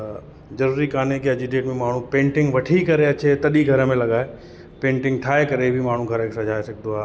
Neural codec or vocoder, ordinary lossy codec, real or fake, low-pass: none; none; real; none